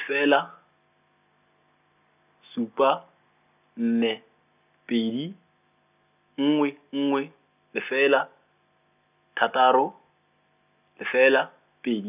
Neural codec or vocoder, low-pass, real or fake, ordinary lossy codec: none; 3.6 kHz; real; none